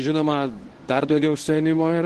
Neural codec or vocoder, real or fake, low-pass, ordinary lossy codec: codec, 24 kHz, 0.9 kbps, WavTokenizer, medium speech release version 1; fake; 10.8 kHz; Opus, 16 kbps